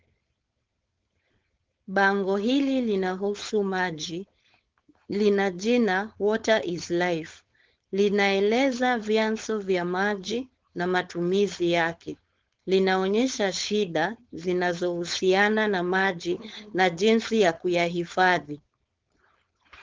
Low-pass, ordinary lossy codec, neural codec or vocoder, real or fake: 7.2 kHz; Opus, 16 kbps; codec, 16 kHz, 4.8 kbps, FACodec; fake